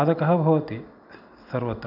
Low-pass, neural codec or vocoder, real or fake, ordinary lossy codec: 5.4 kHz; none; real; none